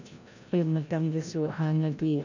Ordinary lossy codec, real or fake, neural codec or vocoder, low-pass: none; fake; codec, 16 kHz, 0.5 kbps, FreqCodec, larger model; 7.2 kHz